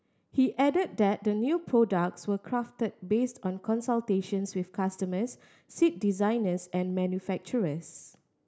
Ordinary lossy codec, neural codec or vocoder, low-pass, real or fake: none; none; none; real